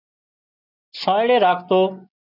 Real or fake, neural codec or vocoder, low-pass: real; none; 5.4 kHz